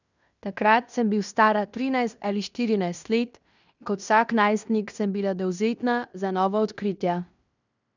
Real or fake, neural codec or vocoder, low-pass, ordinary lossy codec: fake; codec, 16 kHz in and 24 kHz out, 0.9 kbps, LongCat-Audio-Codec, fine tuned four codebook decoder; 7.2 kHz; none